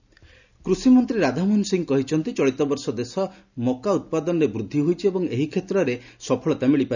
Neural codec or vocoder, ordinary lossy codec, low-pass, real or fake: none; none; 7.2 kHz; real